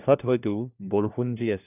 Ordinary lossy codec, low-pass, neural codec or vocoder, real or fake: none; 3.6 kHz; codec, 16 kHz, 1 kbps, FunCodec, trained on LibriTTS, 50 frames a second; fake